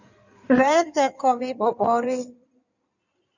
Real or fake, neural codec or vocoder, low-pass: fake; codec, 16 kHz in and 24 kHz out, 2.2 kbps, FireRedTTS-2 codec; 7.2 kHz